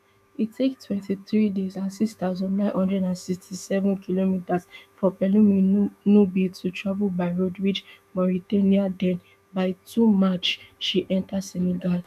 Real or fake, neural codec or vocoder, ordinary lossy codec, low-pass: fake; autoencoder, 48 kHz, 128 numbers a frame, DAC-VAE, trained on Japanese speech; none; 14.4 kHz